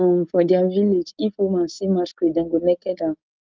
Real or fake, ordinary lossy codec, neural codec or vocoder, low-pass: real; Opus, 32 kbps; none; 7.2 kHz